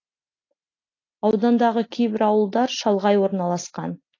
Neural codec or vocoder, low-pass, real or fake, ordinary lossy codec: none; 7.2 kHz; real; AAC, 32 kbps